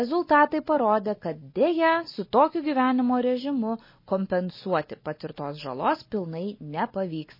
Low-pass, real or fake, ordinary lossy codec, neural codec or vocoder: 5.4 kHz; real; MP3, 24 kbps; none